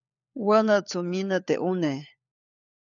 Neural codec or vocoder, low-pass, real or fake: codec, 16 kHz, 4 kbps, FunCodec, trained on LibriTTS, 50 frames a second; 7.2 kHz; fake